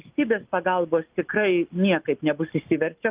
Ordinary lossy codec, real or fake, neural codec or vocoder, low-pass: Opus, 24 kbps; real; none; 3.6 kHz